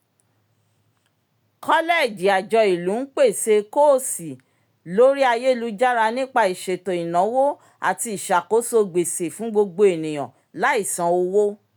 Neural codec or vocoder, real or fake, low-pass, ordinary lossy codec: none; real; none; none